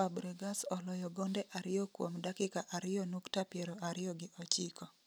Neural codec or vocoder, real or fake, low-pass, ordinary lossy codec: none; real; none; none